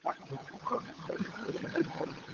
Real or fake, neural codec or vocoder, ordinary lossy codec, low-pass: fake; codec, 16 kHz, 8 kbps, FunCodec, trained on LibriTTS, 25 frames a second; Opus, 16 kbps; 7.2 kHz